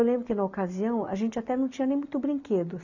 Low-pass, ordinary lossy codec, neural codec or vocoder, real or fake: 7.2 kHz; none; none; real